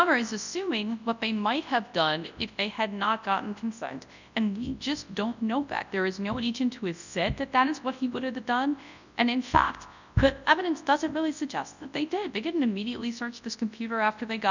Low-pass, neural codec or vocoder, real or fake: 7.2 kHz; codec, 24 kHz, 0.9 kbps, WavTokenizer, large speech release; fake